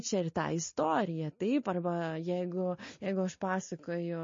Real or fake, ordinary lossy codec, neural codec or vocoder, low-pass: real; MP3, 32 kbps; none; 7.2 kHz